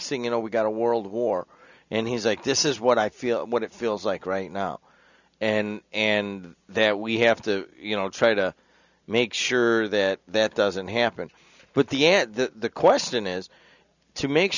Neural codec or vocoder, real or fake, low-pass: none; real; 7.2 kHz